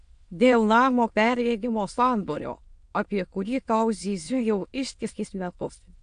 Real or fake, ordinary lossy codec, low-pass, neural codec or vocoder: fake; AAC, 64 kbps; 9.9 kHz; autoencoder, 22.05 kHz, a latent of 192 numbers a frame, VITS, trained on many speakers